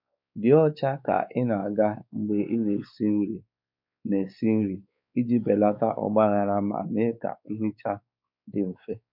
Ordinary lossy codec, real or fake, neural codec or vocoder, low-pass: MP3, 48 kbps; fake; codec, 16 kHz, 4 kbps, X-Codec, WavLM features, trained on Multilingual LibriSpeech; 5.4 kHz